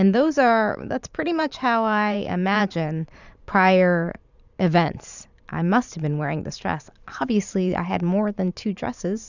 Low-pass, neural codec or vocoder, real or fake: 7.2 kHz; vocoder, 44.1 kHz, 128 mel bands every 512 samples, BigVGAN v2; fake